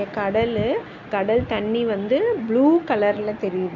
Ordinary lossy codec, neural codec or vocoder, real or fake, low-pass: none; none; real; 7.2 kHz